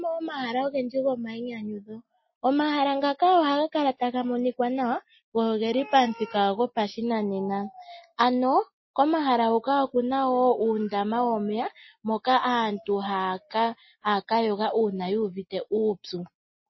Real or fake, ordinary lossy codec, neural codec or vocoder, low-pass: real; MP3, 24 kbps; none; 7.2 kHz